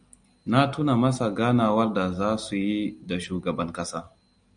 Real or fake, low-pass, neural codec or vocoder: real; 9.9 kHz; none